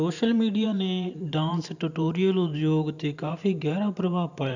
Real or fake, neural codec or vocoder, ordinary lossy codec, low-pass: fake; vocoder, 44.1 kHz, 128 mel bands every 512 samples, BigVGAN v2; none; 7.2 kHz